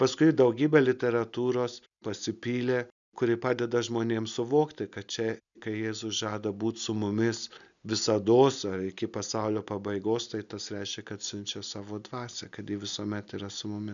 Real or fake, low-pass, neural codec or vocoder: real; 7.2 kHz; none